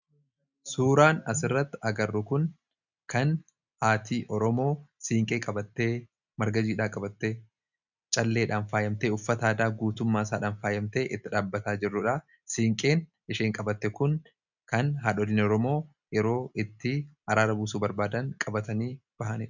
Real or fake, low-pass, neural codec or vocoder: real; 7.2 kHz; none